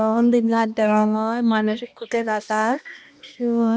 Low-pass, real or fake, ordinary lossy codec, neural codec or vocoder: none; fake; none; codec, 16 kHz, 1 kbps, X-Codec, HuBERT features, trained on balanced general audio